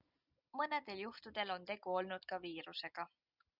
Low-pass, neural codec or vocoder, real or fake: 5.4 kHz; none; real